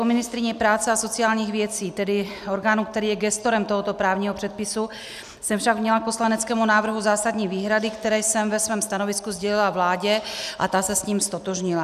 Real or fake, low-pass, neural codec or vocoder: real; 14.4 kHz; none